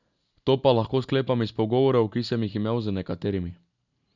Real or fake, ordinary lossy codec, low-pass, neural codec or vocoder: real; none; 7.2 kHz; none